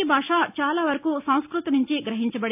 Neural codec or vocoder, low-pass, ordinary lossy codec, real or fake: none; 3.6 kHz; AAC, 32 kbps; real